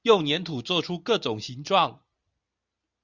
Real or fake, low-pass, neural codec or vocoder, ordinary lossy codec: real; 7.2 kHz; none; Opus, 64 kbps